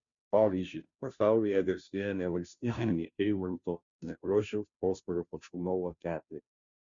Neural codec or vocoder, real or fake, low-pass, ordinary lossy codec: codec, 16 kHz, 0.5 kbps, FunCodec, trained on Chinese and English, 25 frames a second; fake; 7.2 kHz; Opus, 64 kbps